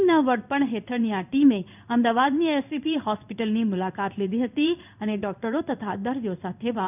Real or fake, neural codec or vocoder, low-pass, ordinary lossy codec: fake; codec, 16 kHz in and 24 kHz out, 1 kbps, XY-Tokenizer; 3.6 kHz; none